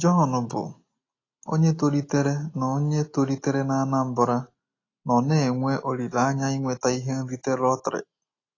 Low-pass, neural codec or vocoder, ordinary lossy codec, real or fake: 7.2 kHz; none; AAC, 32 kbps; real